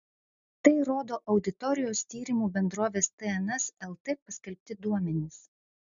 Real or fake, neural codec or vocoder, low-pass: real; none; 7.2 kHz